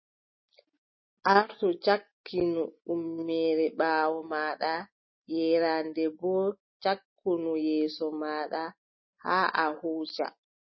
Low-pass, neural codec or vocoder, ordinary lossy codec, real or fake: 7.2 kHz; none; MP3, 24 kbps; real